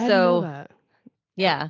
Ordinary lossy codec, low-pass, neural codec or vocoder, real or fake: AAC, 32 kbps; 7.2 kHz; none; real